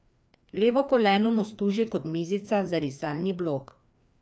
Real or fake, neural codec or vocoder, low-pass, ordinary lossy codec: fake; codec, 16 kHz, 2 kbps, FreqCodec, larger model; none; none